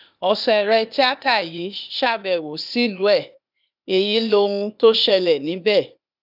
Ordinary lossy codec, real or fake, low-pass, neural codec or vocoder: none; fake; 5.4 kHz; codec, 16 kHz, 0.8 kbps, ZipCodec